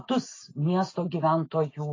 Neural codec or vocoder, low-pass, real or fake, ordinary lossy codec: none; 7.2 kHz; real; AAC, 32 kbps